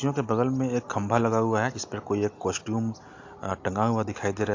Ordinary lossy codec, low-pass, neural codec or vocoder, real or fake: AAC, 48 kbps; 7.2 kHz; none; real